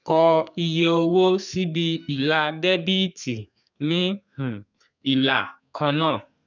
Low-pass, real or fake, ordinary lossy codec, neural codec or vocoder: 7.2 kHz; fake; none; codec, 32 kHz, 1.9 kbps, SNAC